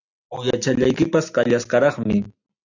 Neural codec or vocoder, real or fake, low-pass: none; real; 7.2 kHz